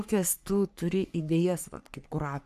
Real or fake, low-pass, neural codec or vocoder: fake; 14.4 kHz; codec, 44.1 kHz, 3.4 kbps, Pupu-Codec